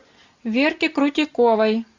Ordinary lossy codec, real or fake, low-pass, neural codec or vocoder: Opus, 64 kbps; real; 7.2 kHz; none